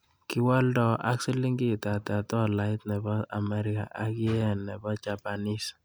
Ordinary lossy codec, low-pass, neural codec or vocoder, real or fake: none; none; none; real